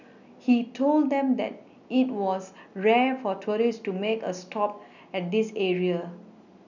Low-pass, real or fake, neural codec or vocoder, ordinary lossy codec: 7.2 kHz; real; none; none